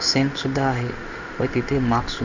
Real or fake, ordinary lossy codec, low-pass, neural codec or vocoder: fake; none; 7.2 kHz; codec, 16 kHz in and 24 kHz out, 1 kbps, XY-Tokenizer